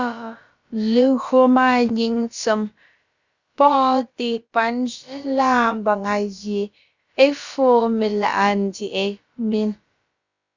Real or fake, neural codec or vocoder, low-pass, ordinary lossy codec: fake; codec, 16 kHz, about 1 kbps, DyCAST, with the encoder's durations; 7.2 kHz; Opus, 64 kbps